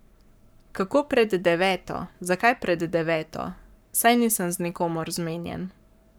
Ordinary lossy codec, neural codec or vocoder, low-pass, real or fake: none; codec, 44.1 kHz, 7.8 kbps, Pupu-Codec; none; fake